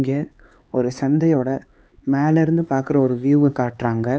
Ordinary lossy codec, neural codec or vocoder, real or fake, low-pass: none; codec, 16 kHz, 4 kbps, X-Codec, HuBERT features, trained on LibriSpeech; fake; none